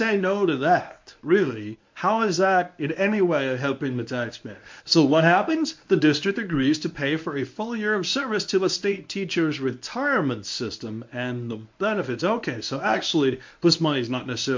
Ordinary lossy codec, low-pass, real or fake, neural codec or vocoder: MP3, 48 kbps; 7.2 kHz; fake; codec, 24 kHz, 0.9 kbps, WavTokenizer, medium speech release version 1